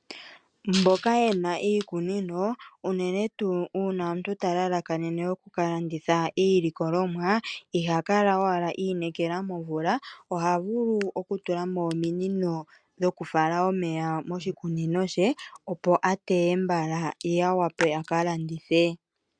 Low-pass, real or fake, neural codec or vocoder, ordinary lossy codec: 9.9 kHz; real; none; MP3, 96 kbps